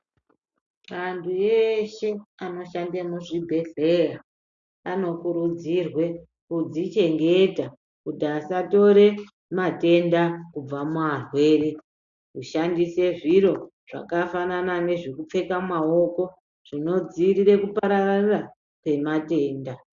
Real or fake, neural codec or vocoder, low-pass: real; none; 7.2 kHz